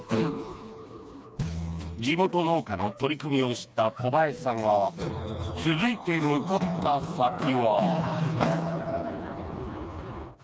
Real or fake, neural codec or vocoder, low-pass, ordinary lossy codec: fake; codec, 16 kHz, 2 kbps, FreqCodec, smaller model; none; none